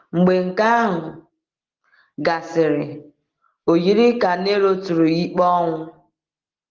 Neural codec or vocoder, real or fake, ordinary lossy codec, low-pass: none; real; Opus, 16 kbps; 7.2 kHz